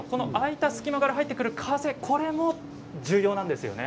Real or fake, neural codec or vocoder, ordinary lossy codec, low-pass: real; none; none; none